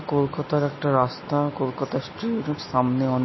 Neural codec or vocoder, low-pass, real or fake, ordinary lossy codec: none; 7.2 kHz; real; MP3, 24 kbps